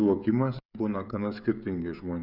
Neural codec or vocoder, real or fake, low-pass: codec, 16 kHz, 16 kbps, FreqCodec, smaller model; fake; 5.4 kHz